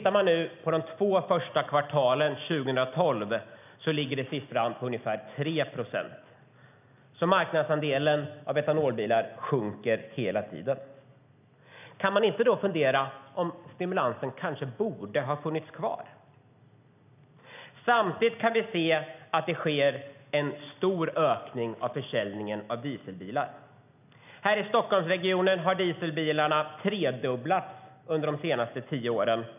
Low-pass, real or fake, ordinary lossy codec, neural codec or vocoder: 3.6 kHz; real; none; none